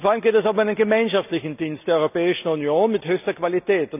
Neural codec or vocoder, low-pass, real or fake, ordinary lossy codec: none; 3.6 kHz; real; none